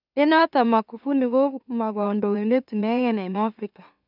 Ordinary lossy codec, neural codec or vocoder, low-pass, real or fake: none; autoencoder, 44.1 kHz, a latent of 192 numbers a frame, MeloTTS; 5.4 kHz; fake